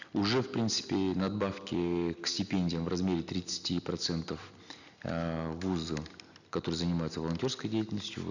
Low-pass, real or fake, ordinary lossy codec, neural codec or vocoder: 7.2 kHz; real; none; none